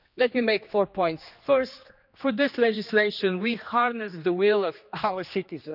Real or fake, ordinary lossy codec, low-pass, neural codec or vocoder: fake; none; 5.4 kHz; codec, 16 kHz, 2 kbps, X-Codec, HuBERT features, trained on general audio